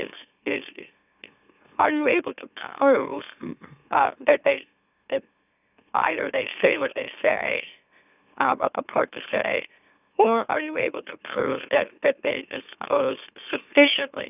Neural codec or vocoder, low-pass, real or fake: autoencoder, 44.1 kHz, a latent of 192 numbers a frame, MeloTTS; 3.6 kHz; fake